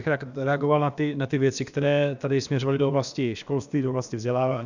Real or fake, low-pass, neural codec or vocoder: fake; 7.2 kHz; codec, 16 kHz, about 1 kbps, DyCAST, with the encoder's durations